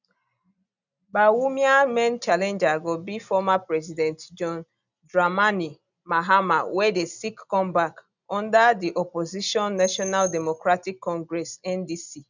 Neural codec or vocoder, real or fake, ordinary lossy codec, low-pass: none; real; none; 7.2 kHz